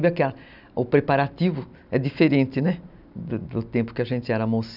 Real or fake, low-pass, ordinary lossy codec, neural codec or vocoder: real; 5.4 kHz; none; none